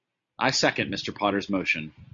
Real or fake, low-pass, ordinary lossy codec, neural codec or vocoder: real; 7.2 kHz; MP3, 64 kbps; none